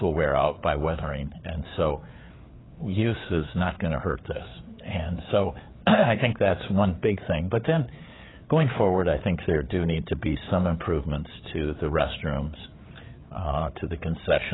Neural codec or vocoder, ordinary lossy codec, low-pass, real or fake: codec, 16 kHz, 4 kbps, FreqCodec, larger model; AAC, 16 kbps; 7.2 kHz; fake